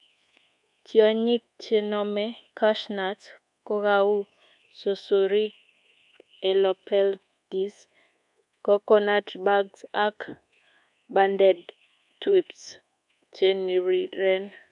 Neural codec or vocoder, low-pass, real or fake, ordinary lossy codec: codec, 24 kHz, 1.2 kbps, DualCodec; 10.8 kHz; fake; none